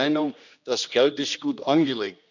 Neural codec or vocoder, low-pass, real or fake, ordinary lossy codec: codec, 16 kHz, 1 kbps, X-Codec, HuBERT features, trained on general audio; 7.2 kHz; fake; none